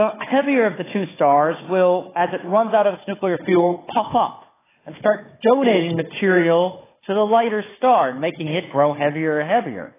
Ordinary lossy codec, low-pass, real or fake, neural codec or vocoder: AAC, 16 kbps; 3.6 kHz; fake; codec, 16 kHz, 4 kbps, FunCodec, trained on Chinese and English, 50 frames a second